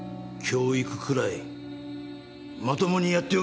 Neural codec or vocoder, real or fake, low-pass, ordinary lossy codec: none; real; none; none